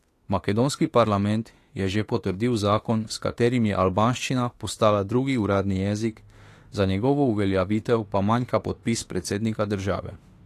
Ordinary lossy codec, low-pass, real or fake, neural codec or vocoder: AAC, 48 kbps; 14.4 kHz; fake; autoencoder, 48 kHz, 32 numbers a frame, DAC-VAE, trained on Japanese speech